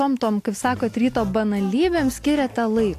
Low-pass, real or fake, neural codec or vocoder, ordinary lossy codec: 14.4 kHz; real; none; AAC, 64 kbps